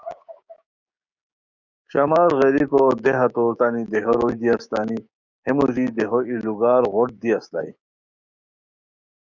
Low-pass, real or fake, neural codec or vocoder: 7.2 kHz; fake; codec, 16 kHz, 6 kbps, DAC